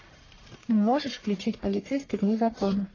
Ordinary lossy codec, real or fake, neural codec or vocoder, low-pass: AAC, 32 kbps; fake; codec, 44.1 kHz, 1.7 kbps, Pupu-Codec; 7.2 kHz